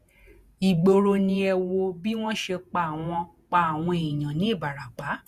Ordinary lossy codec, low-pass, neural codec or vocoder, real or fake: Opus, 64 kbps; 14.4 kHz; vocoder, 48 kHz, 128 mel bands, Vocos; fake